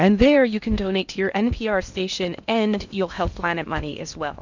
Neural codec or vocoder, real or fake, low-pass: codec, 16 kHz in and 24 kHz out, 0.8 kbps, FocalCodec, streaming, 65536 codes; fake; 7.2 kHz